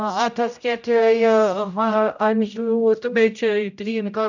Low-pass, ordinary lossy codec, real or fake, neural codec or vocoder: 7.2 kHz; none; fake; codec, 16 kHz, 0.5 kbps, X-Codec, HuBERT features, trained on general audio